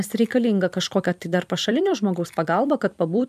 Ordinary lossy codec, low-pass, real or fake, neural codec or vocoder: MP3, 96 kbps; 14.4 kHz; fake; vocoder, 44.1 kHz, 128 mel bands every 256 samples, BigVGAN v2